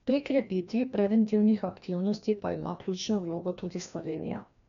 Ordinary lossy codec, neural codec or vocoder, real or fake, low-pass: none; codec, 16 kHz, 1 kbps, FreqCodec, larger model; fake; 7.2 kHz